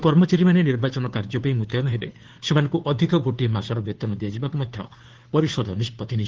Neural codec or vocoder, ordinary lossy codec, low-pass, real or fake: codec, 16 kHz, 2 kbps, FunCodec, trained on Chinese and English, 25 frames a second; Opus, 16 kbps; 7.2 kHz; fake